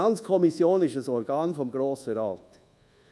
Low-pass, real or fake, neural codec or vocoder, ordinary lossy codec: none; fake; codec, 24 kHz, 1.2 kbps, DualCodec; none